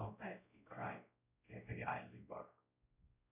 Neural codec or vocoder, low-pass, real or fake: codec, 16 kHz, 0.5 kbps, X-Codec, WavLM features, trained on Multilingual LibriSpeech; 3.6 kHz; fake